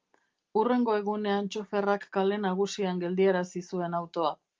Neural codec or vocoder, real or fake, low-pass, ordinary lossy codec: none; real; 7.2 kHz; Opus, 32 kbps